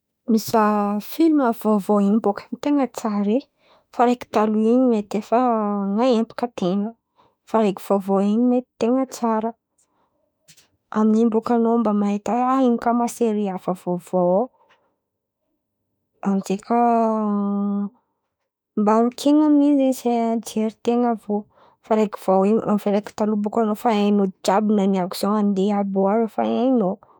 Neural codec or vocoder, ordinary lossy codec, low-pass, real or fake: autoencoder, 48 kHz, 32 numbers a frame, DAC-VAE, trained on Japanese speech; none; none; fake